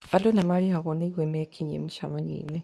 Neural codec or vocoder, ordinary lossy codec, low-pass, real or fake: codec, 24 kHz, 0.9 kbps, WavTokenizer, medium speech release version 2; none; none; fake